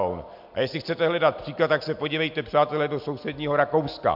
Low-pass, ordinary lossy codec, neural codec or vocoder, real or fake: 5.4 kHz; MP3, 48 kbps; none; real